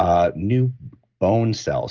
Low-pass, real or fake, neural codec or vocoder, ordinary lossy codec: 7.2 kHz; real; none; Opus, 32 kbps